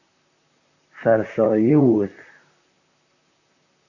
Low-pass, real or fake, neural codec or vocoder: 7.2 kHz; fake; vocoder, 44.1 kHz, 128 mel bands, Pupu-Vocoder